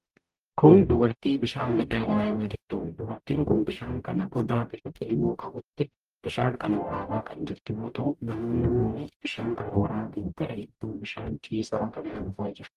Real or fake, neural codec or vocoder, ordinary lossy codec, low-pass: fake; codec, 44.1 kHz, 0.9 kbps, DAC; Opus, 24 kbps; 14.4 kHz